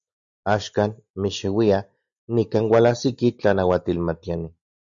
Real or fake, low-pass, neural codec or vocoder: real; 7.2 kHz; none